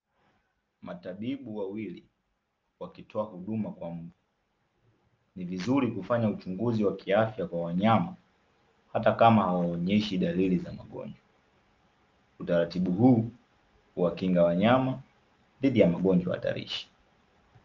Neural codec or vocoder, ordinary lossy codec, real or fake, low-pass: none; Opus, 24 kbps; real; 7.2 kHz